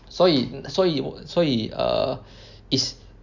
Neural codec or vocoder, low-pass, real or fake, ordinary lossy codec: none; 7.2 kHz; real; none